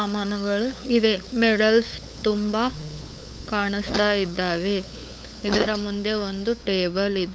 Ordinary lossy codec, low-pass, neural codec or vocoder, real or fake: none; none; codec, 16 kHz, 8 kbps, FunCodec, trained on LibriTTS, 25 frames a second; fake